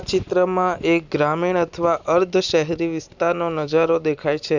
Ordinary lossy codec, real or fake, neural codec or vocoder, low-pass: none; real; none; 7.2 kHz